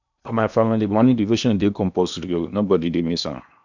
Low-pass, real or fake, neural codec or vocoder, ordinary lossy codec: 7.2 kHz; fake; codec, 16 kHz in and 24 kHz out, 0.8 kbps, FocalCodec, streaming, 65536 codes; none